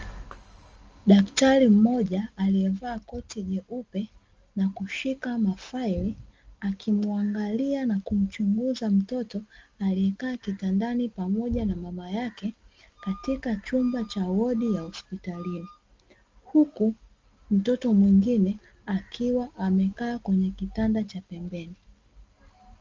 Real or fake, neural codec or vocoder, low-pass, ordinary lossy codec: real; none; 7.2 kHz; Opus, 24 kbps